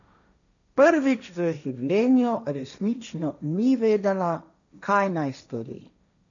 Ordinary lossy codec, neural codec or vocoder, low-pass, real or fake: none; codec, 16 kHz, 1.1 kbps, Voila-Tokenizer; 7.2 kHz; fake